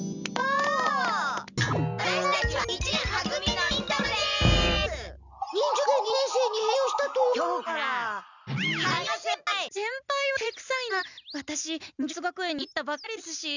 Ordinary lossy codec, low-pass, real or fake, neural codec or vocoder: none; 7.2 kHz; real; none